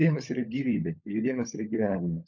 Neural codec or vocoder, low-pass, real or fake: codec, 16 kHz, 16 kbps, FunCodec, trained on LibriTTS, 50 frames a second; 7.2 kHz; fake